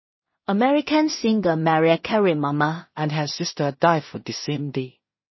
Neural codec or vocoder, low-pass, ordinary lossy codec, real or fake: codec, 16 kHz in and 24 kHz out, 0.4 kbps, LongCat-Audio-Codec, two codebook decoder; 7.2 kHz; MP3, 24 kbps; fake